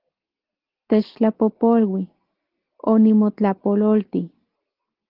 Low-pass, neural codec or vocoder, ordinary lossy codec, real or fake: 5.4 kHz; none; Opus, 16 kbps; real